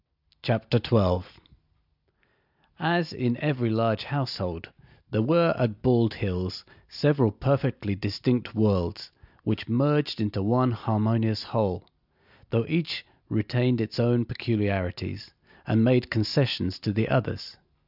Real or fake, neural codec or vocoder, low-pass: real; none; 5.4 kHz